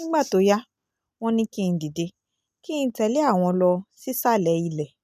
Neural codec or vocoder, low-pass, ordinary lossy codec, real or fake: none; 14.4 kHz; none; real